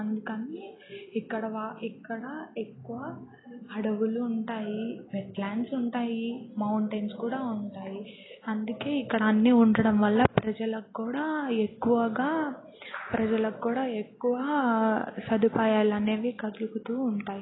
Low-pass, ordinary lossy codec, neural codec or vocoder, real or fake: 7.2 kHz; AAC, 16 kbps; none; real